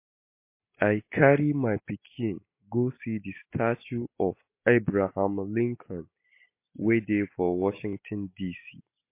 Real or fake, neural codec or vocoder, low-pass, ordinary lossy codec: real; none; 3.6 kHz; MP3, 24 kbps